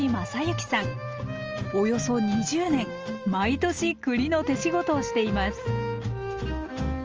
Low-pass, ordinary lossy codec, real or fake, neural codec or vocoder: 7.2 kHz; Opus, 24 kbps; real; none